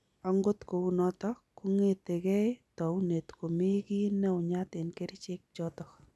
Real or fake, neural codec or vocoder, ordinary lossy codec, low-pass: real; none; none; none